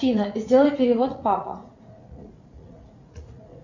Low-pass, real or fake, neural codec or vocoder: 7.2 kHz; fake; vocoder, 44.1 kHz, 80 mel bands, Vocos